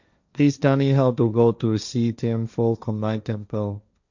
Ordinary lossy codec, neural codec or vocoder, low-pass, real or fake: none; codec, 16 kHz, 1.1 kbps, Voila-Tokenizer; 7.2 kHz; fake